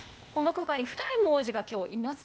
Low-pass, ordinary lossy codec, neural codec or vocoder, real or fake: none; none; codec, 16 kHz, 0.8 kbps, ZipCodec; fake